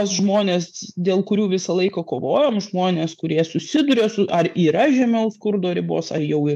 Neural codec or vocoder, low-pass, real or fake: autoencoder, 48 kHz, 128 numbers a frame, DAC-VAE, trained on Japanese speech; 14.4 kHz; fake